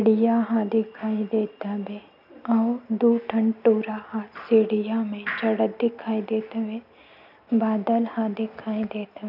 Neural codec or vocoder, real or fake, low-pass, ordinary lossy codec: none; real; 5.4 kHz; none